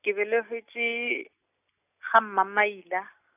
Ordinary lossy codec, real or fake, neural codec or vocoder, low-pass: none; real; none; 3.6 kHz